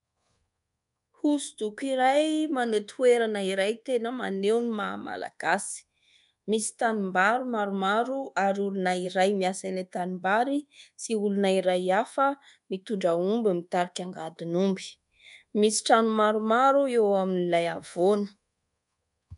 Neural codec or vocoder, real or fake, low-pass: codec, 24 kHz, 1.2 kbps, DualCodec; fake; 10.8 kHz